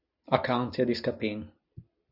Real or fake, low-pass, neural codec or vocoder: real; 5.4 kHz; none